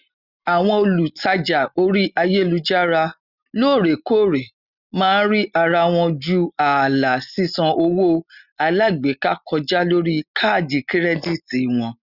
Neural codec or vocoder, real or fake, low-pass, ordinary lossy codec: none; real; 5.4 kHz; Opus, 64 kbps